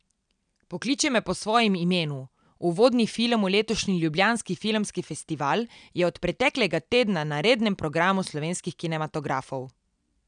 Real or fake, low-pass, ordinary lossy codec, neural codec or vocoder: real; 9.9 kHz; none; none